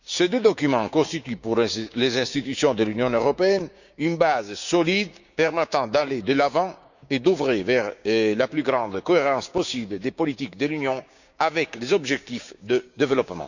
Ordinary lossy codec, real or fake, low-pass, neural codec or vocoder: none; fake; 7.2 kHz; codec, 16 kHz, 6 kbps, DAC